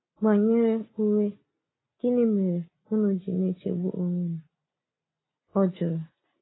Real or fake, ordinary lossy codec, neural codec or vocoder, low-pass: real; AAC, 16 kbps; none; 7.2 kHz